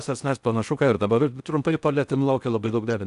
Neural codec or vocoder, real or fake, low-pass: codec, 16 kHz in and 24 kHz out, 0.6 kbps, FocalCodec, streaming, 4096 codes; fake; 10.8 kHz